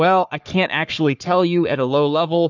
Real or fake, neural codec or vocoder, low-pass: fake; codec, 44.1 kHz, 3.4 kbps, Pupu-Codec; 7.2 kHz